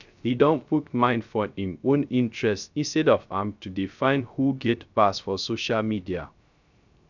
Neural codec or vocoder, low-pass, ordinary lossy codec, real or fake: codec, 16 kHz, 0.3 kbps, FocalCodec; 7.2 kHz; none; fake